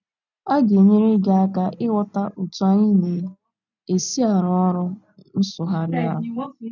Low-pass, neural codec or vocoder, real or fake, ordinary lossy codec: 7.2 kHz; none; real; none